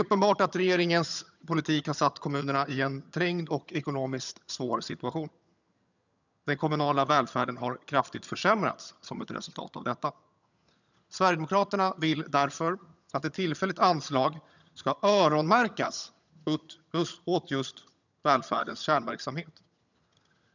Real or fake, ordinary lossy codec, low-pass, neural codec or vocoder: fake; none; 7.2 kHz; vocoder, 22.05 kHz, 80 mel bands, HiFi-GAN